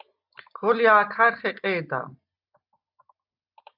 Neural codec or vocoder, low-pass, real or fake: none; 5.4 kHz; real